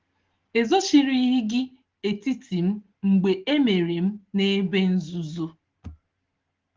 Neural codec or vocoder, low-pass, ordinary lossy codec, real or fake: none; 7.2 kHz; Opus, 16 kbps; real